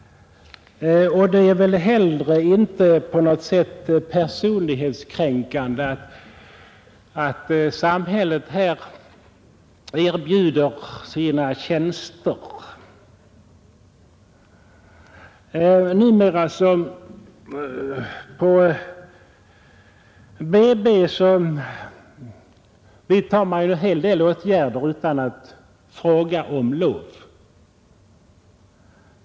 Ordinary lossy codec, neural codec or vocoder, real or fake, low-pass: none; none; real; none